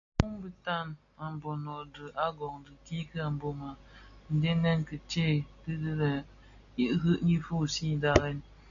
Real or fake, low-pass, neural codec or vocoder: real; 7.2 kHz; none